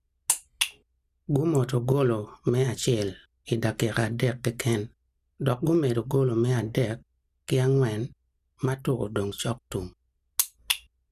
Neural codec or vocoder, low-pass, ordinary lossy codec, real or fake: none; 14.4 kHz; none; real